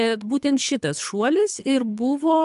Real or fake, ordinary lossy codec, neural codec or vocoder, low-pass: fake; AAC, 96 kbps; codec, 24 kHz, 3 kbps, HILCodec; 10.8 kHz